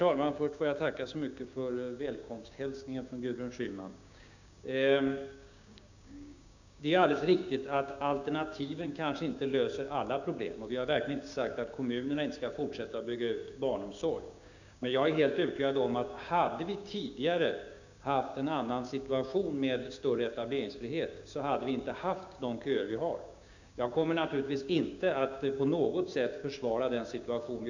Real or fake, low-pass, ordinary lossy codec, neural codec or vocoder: fake; 7.2 kHz; none; codec, 16 kHz, 6 kbps, DAC